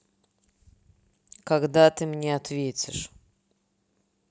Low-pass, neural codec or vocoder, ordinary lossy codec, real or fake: none; none; none; real